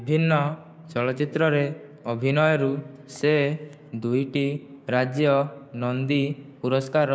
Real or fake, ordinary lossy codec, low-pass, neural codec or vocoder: fake; none; none; codec, 16 kHz, 6 kbps, DAC